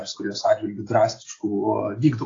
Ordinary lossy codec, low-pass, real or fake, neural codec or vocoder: AAC, 32 kbps; 7.2 kHz; real; none